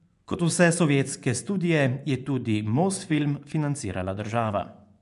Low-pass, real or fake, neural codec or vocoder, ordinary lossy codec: 10.8 kHz; fake; vocoder, 24 kHz, 100 mel bands, Vocos; none